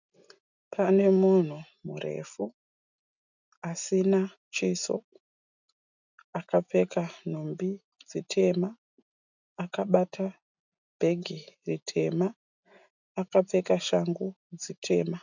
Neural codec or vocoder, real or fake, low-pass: none; real; 7.2 kHz